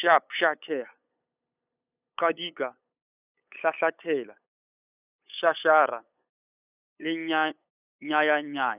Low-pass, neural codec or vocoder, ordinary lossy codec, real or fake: 3.6 kHz; codec, 16 kHz, 8 kbps, FunCodec, trained on LibriTTS, 25 frames a second; none; fake